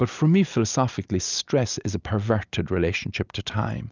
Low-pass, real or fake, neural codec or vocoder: 7.2 kHz; real; none